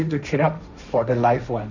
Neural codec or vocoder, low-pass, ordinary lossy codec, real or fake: codec, 16 kHz, 1.1 kbps, Voila-Tokenizer; 7.2 kHz; none; fake